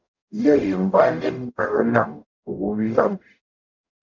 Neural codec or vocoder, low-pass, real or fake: codec, 44.1 kHz, 0.9 kbps, DAC; 7.2 kHz; fake